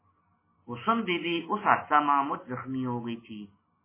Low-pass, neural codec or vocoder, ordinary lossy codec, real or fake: 3.6 kHz; none; MP3, 16 kbps; real